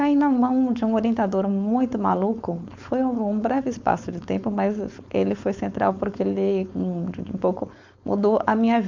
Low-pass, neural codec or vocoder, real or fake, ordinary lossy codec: 7.2 kHz; codec, 16 kHz, 4.8 kbps, FACodec; fake; MP3, 64 kbps